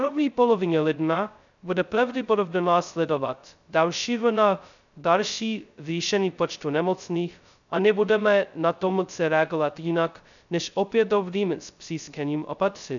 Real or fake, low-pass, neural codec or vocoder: fake; 7.2 kHz; codec, 16 kHz, 0.2 kbps, FocalCodec